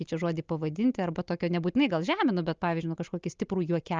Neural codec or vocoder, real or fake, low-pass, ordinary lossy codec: none; real; 7.2 kHz; Opus, 24 kbps